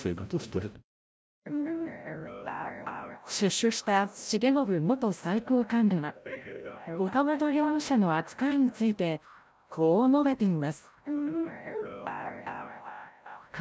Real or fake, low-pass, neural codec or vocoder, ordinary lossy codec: fake; none; codec, 16 kHz, 0.5 kbps, FreqCodec, larger model; none